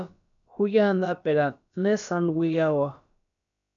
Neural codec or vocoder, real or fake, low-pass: codec, 16 kHz, about 1 kbps, DyCAST, with the encoder's durations; fake; 7.2 kHz